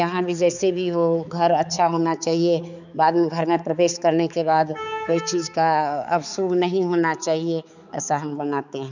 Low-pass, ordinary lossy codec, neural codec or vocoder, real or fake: 7.2 kHz; none; codec, 16 kHz, 4 kbps, X-Codec, HuBERT features, trained on balanced general audio; fake